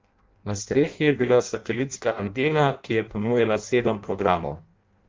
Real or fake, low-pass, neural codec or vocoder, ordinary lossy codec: fake; 7.2 kHz; codec, 16 kHz in and 24 kHz out, 0.6 kbps, FireRedTTS-2 codec; Opus, 24 kbps